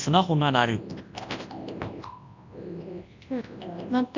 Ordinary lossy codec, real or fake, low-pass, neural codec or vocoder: MP3, 64 kbps; fake; 7.2 kHz; codec, 24 kHz, 0.9 kbps, WavTokenizer, large speech release